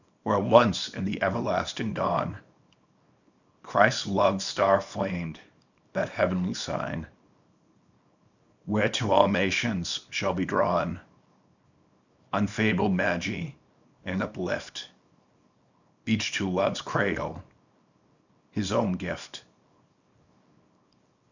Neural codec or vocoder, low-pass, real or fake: codec, 24 kHz, 0.9 kbps, WavTokenizer, small release; 7.2 kHz; fake